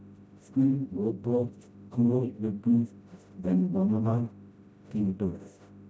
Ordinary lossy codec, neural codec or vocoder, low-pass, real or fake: none; codec, 16 kHz, 0.5 kbps, FreqCodec, smaller model; none; fake